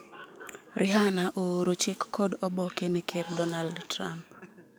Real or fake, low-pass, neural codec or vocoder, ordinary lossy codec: fake; none; codec, 44.1 kHz, 7.8 kbps, DAC; none